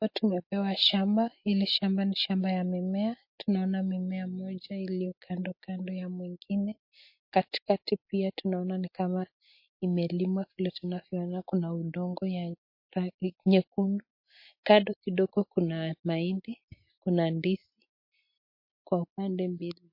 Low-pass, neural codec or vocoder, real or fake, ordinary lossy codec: 5.4 kHz; none; real; MP3, 32 kbps